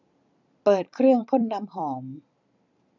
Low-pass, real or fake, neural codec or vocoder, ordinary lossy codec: 7.2 kHz; fake; vocoder, 44.1 kHz, 128 mel bands every 256 samples, BigVGAN v2; none